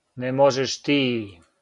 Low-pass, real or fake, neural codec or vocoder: 10.8 kHz; real; none